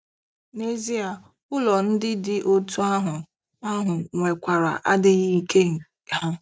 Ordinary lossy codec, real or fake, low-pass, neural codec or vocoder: none; real; none; none